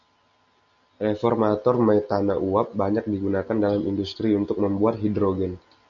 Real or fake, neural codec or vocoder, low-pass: real; none; 7.2 kHz